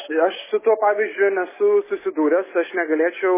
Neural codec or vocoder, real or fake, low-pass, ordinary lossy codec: none; real; 3.6 kHz; MP3, 16 kbps